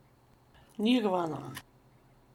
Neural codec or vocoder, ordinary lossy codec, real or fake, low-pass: none; MP3, 96 kbps; real; 19.8 kHz